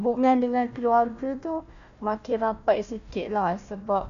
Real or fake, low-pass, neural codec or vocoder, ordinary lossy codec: fake; 7.2 kHz; codec, 16 kHz, 1 kbps, FunCodec, trained on Chinese and English, 50 frames a second; none